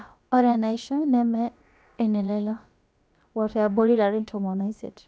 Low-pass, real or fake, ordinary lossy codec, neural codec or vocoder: none; fake; none; codec, 16 kHz, about 1 kbps, DyCAST, with the encoder's durations